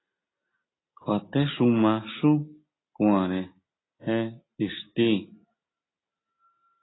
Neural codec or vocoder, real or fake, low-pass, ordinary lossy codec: none; real; 7.2 kHz; AAC, 16 kbps